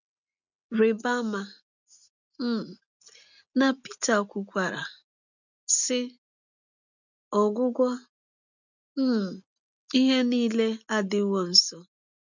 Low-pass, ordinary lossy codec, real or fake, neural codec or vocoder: 7.2 kHz; none; real; none